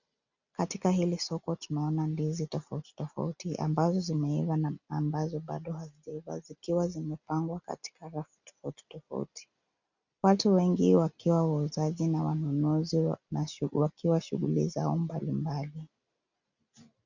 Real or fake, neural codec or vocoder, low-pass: real; none; 7.2 kHz